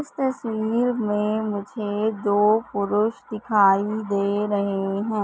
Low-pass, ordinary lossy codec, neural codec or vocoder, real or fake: none; none; none; real